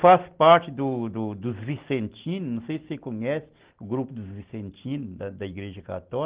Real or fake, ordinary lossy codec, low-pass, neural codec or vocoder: real; Opus, 16 kbps; 3.6 kHz; none